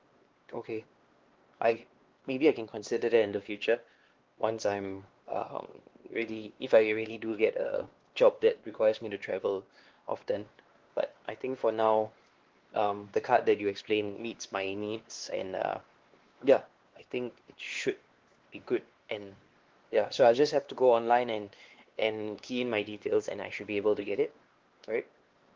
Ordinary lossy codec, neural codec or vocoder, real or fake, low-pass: Opus, 16 kbps; codec, 16 kHz, 2 kbps, X-Codec, WavLM features, trained on Multilingual LibriSpeech; fake; 7.2 kHz